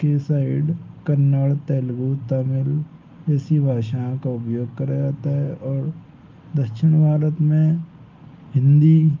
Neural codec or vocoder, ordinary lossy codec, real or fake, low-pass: none; Opus, 24 kbps; real; 7.2 kHz